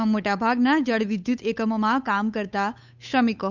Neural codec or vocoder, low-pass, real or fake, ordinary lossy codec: codec, 16 kHz, 8 kbps, FunCodec, trained on Chinese and English, 25 frames a second; 7.2 kHz; fake; none